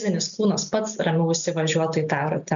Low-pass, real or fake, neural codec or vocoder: 7.2 kHz; real; none